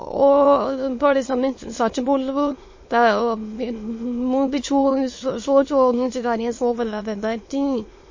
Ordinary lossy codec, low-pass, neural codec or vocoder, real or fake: MP3, 32 kbps; 7.2 kHz; autoencoder, 22.05 kHz, a latent of 192 numbers a frame, VITS, trained on many speakers; fake